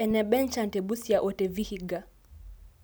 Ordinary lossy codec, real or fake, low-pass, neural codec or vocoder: none; real; none; none